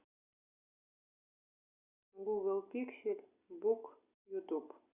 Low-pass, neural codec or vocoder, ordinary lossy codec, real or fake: 3.6 kHz; none; Opus, 64 kbps; real